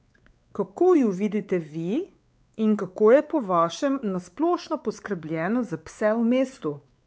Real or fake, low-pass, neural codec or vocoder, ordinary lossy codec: fake; none; codec, 16 kHz, 4 kbps, X-Codec, WavLM features, trained on Multilingual LibriSpeech; none